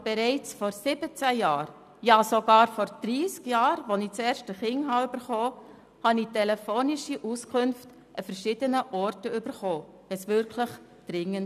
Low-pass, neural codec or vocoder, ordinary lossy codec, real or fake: 14.4 kHz; none; none; real